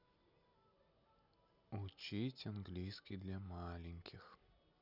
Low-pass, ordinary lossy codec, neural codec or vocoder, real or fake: 5.4 kHz; none; none; real